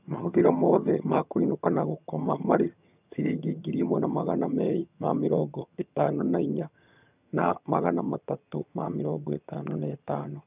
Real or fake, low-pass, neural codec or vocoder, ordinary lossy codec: fake; 3.6 kHz; vocoder, 22.05 kHz, 80 mel bands, HiFi-GAN; none